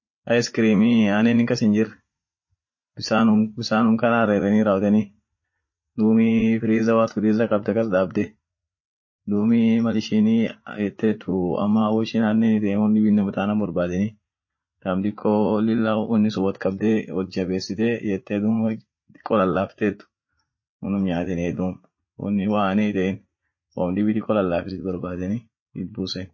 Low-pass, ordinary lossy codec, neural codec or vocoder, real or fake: 7.2 kHz; MP3, 32 kbps; vocoder, 44.1 kHz, 80 mel bands, Vocos; fake